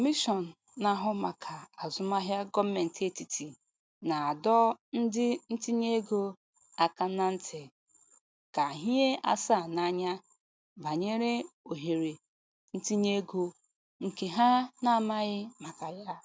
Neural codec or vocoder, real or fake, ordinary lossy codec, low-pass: none; real; none; none